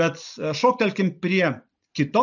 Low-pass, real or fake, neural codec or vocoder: 7.2 kHz; real; none